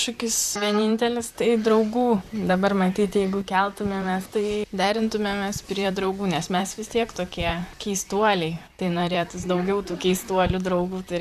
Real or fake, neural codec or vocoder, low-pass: fake; vocoder, 44.1 kHz, 128 mel bands, Pupu-Vocoder; 14.4 kHz